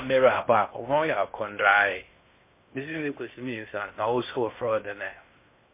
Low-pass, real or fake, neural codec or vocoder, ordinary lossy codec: 3.6 kHz; fake; codec, 16 kHz in and 24 kHz out, 0.6 kbps, FocalCodec, streaming, 4096 codes; MP3, 24 kbps